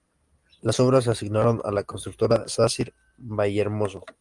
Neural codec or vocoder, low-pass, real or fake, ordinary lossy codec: none; 10.8 kHz; real; Opus, 32 kbps